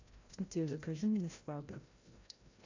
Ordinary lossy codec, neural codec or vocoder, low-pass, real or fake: AAC, 48 kbps; codec, 16 kHz, 0.5 kbps, FreqCodec, larger model; 7.2 kHz; fake